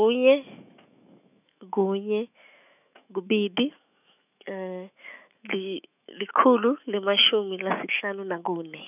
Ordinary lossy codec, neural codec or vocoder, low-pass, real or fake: none; autoencoder, 48 kHz, 128 numbers a frame, DAC-VAE, trained on Japanese speech; 3.6 kHz; fake